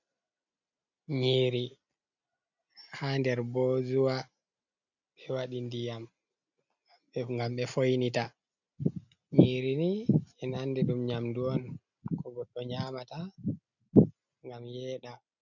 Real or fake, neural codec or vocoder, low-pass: real; none; 7.2 kHz